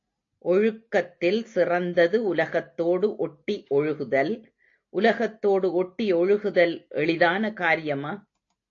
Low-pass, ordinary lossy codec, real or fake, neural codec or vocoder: 7.2 kHz; AAC, 48 kbps; real; none